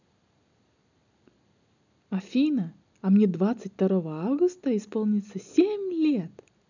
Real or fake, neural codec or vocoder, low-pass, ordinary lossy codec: real; none; 7.2 kHz; none